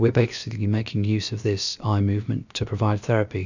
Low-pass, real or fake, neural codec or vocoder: 7.2 kHz; fake; codec, 16 kHz, about 1 kbps, DyCAST, with the encoder's durations